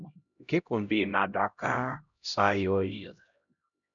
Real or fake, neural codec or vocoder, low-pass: fake; codec, 16 kHz, 0.5 kbps, X-Codec, HuBERT features, trained on LibriSpeech; 7.2 kHz